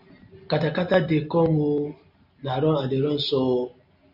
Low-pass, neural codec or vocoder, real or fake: 5.4 kHz; none; real